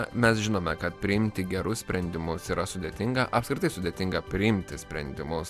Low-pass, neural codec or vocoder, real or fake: 14.4 kHz; none; real